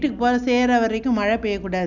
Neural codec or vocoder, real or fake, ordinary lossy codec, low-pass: none; real; none; 7.2 kHz